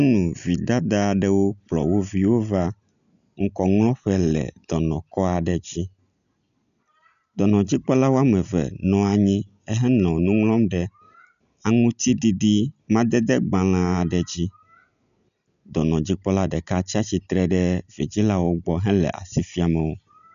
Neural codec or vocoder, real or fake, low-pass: none; real; 7.2 kHz